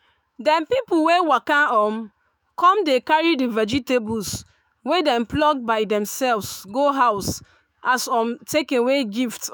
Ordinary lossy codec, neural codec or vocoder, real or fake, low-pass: none; autoencoder, 48 kHz, 128 numbers a frame, DAC-VAE, trained on Japanese speech; fake; none